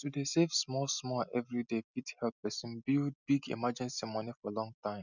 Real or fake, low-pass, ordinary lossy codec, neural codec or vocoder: real; 7.2 kHz; none; none